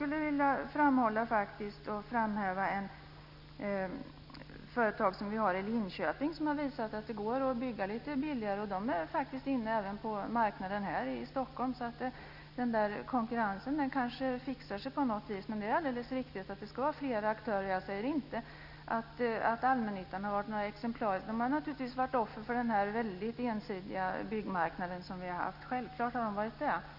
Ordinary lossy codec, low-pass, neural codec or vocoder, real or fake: none; 5.4 kHz; none; real